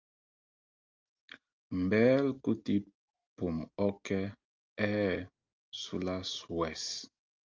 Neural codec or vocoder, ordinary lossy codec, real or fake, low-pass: none; Opus, 32 kbps; real; 7.2 kHz